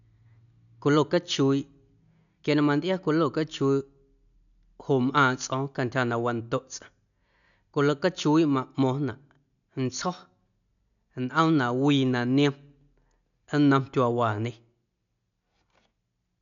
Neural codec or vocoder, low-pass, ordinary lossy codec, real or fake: none; 7.2 kHz; none; real